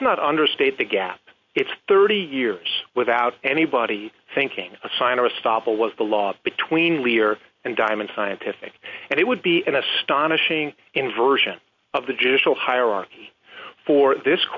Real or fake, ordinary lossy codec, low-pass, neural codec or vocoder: real; MP3, 48 kbps; 7.2 kHz; none